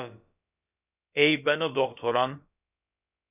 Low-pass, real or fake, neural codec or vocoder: 3.6 kHz; fake; codec, 16 kHz, about 1 kbps, DyCAST, with the encoder's durations